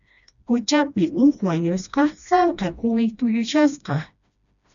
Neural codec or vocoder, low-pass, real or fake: codec, 16 kHz, 1 kbps, FreqCodec, smaller model; 7.2 kHz; fake